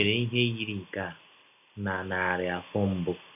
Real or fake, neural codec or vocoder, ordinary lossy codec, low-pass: real; none; none; 3.6 kHz